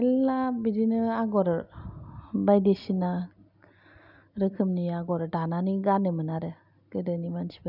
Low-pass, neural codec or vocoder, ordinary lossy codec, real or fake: 5.4 kHz; none; none; real